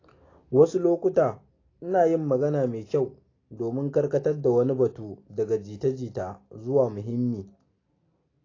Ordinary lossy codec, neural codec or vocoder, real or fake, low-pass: AAC, 32 kbps; none; real; 7.2 kHz